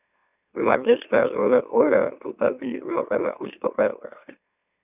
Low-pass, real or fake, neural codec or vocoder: 3.6 kHz; fake; autoencoder, 44.1 kHz, a latent of 192 numbers a frame, MeloTTS